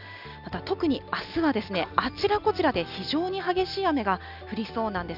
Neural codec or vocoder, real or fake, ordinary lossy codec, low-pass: none; real; none; 5.4 kHz